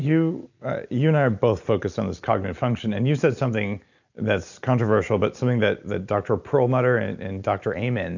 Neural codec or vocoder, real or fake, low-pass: none; real; 7.2 kHz